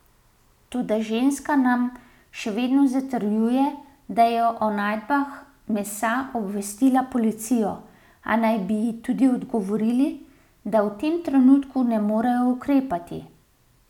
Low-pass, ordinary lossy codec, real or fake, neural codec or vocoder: 19.8 kHz; none; real; none